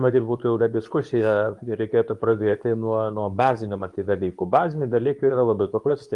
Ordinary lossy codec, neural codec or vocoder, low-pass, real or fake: Opus, 32 kbps; codec, 24 kHz, 0.9 kbps, WavTokenizer, medium speech release version 2; 10.8 kHz; fake